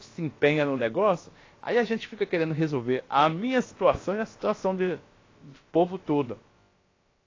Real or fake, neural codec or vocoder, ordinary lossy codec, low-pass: fake; codec, 16 kHz, about 1 kbps, DyCAST, with the encoder's durations; AAC, 32 kbps; 7.2 kHz